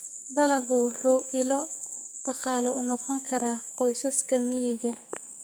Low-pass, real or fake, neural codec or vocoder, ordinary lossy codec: none; fake; codec, 44.1 kHz, 2.6 kbps, SNAC; none